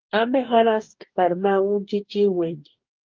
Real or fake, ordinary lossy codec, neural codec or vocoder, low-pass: fake; Opus, 32 kbps; codec, 44.1 kHz, 2.6 kbps, DAC; 7.2 kHz